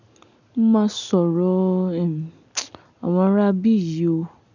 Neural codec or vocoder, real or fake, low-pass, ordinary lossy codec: none; real; 7.2 kHz; none